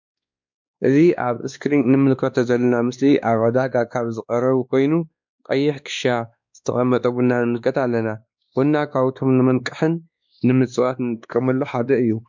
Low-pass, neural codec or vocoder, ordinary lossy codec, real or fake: 7.2 kHz; codec, 16 kHz, 2 kbps, X-Codec, WavLM features, trained on Multilingual LibriSpeech; MP3, 64 kbps; fake